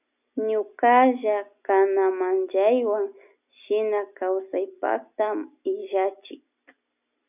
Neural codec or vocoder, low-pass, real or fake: none; 3.6 kHz; real